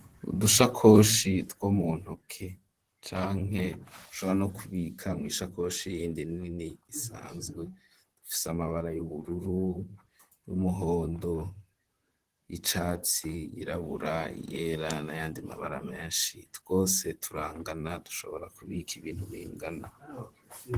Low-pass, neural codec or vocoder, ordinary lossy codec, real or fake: 14.4 kHz; vocoder, 44.1 kHz, 128 mel bands, Pupu-Vocoder; Opus, 16 kbps; fake